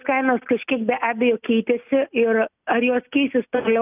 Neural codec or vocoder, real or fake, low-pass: none; real; 3.6 kHz